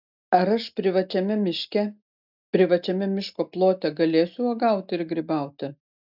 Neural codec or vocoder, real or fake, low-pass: none; real; 5.4 kHz